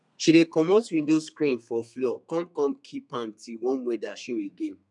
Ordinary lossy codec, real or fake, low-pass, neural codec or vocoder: none; fake; 10.8 kHz; codec, 32 kHz, 1.9 kbps, SNAC